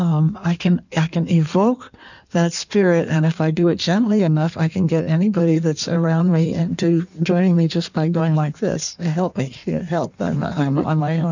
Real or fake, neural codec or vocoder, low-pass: fake; codec, 16 kHz in and 24 kHz out, 1.1 kbps, FireRedTTS-2 codec; 7.2 kHz